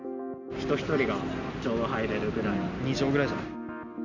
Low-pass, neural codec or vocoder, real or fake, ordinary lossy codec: 7.2 kHz; none; real; none